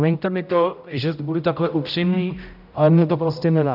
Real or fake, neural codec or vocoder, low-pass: fake; codec, 16 kHz, 0.5 kbps, X-Codec, HuBERT features, trained on general audio; 5.4 kHz